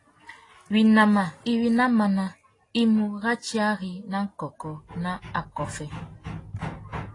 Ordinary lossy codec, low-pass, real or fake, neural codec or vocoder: AAC, 32 kbps; 10.8 kHz; real; none